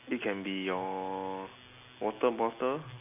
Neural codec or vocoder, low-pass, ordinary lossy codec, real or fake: none; 3.6 kHz; AAC, 32 kbps; real